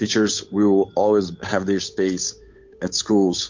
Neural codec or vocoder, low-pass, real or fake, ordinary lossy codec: codec, 16 kHz, 8 kbps, FunCodec, trained on Chinese and English, 25 frames a second; 7.2 kHz; fake; MP3, 48 kbps